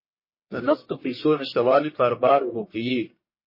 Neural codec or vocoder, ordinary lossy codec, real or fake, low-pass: codec, 44.1 kHz, 1.7 kbps, Pupu-Codec; MP3, 24 kbps; fake; 5.4 kHz